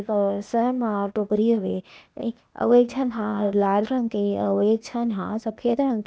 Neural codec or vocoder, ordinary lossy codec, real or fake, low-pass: codec, 16 kHz, 0.8 kbps, ZipCodec; none; fake; none